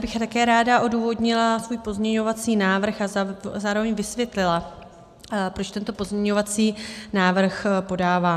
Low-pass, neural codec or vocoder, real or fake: 14.4 kHz; none; real